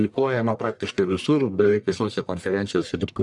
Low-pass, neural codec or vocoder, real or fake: 10.8 kHz; codec, 44.1 kHz, 1.7 kbps, Pupu-Codec; fake